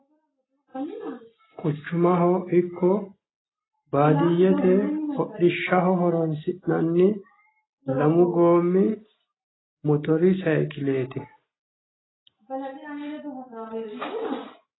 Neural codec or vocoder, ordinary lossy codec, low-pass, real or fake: none; AAC, 16 kbps; 7.2 kHz; real